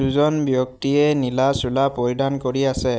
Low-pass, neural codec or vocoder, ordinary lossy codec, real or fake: none; none; none; real